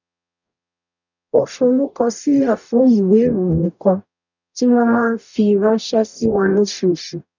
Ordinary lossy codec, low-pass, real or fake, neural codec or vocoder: none; 7.2 kHz; fake; codec, 44.1 kHz, 0.9 kbps, DAC